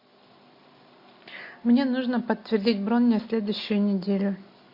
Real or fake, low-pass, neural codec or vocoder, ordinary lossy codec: real; 5.4 kHz; none; MP3, 32 kbps